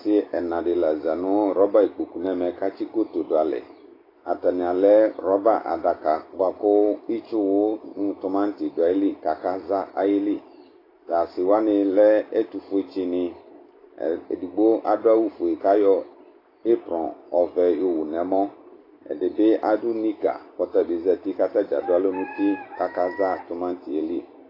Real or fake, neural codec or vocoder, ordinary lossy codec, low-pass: real; none; AAC, 24 kbps; 5.4 kHz